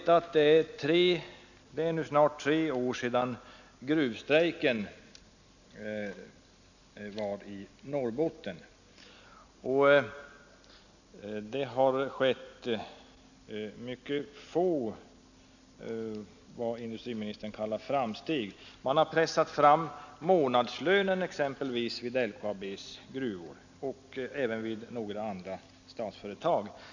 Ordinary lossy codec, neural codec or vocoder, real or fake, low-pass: none; none; real; 7.2 kHz